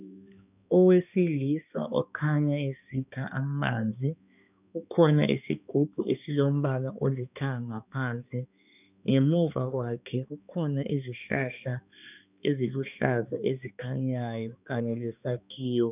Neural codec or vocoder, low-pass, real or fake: codec, 16 kHz, 2 kbps, X-Codec, HuBERT features, trained on balanced general audio; 3.6 kHz; fake